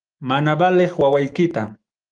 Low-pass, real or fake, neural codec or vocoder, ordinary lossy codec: 9.9 kHz; fake; autoencoder, 48 kHz, 128 numbers a frame, DAC-VAE, trained on Japanese speech; Opus, 32 kbps